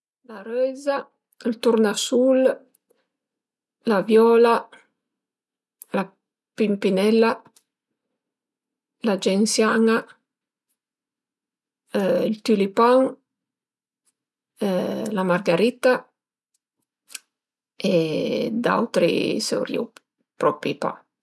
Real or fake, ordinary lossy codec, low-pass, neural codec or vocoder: real; none; none; none